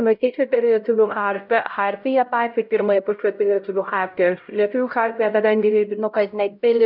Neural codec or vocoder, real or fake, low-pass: codec, 16 kHz, 0.5 kbps, X-Codec, HuBERT features, trained on LibriSpeech; fake; 5.4 kHz